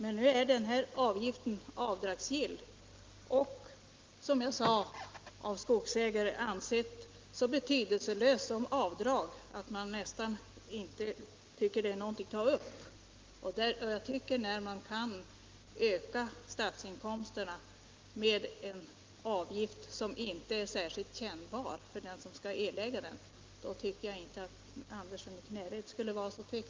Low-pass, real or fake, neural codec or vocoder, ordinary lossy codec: 7.2 kHz; real; none; Opus, 32 kbps